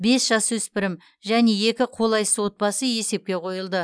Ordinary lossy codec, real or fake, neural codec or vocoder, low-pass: none; real; none; none